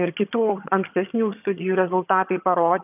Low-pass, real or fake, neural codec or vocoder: 3.6 kHz; fake; vocoder, 22.05 kHz, 80 mel bands, HiFi-GAN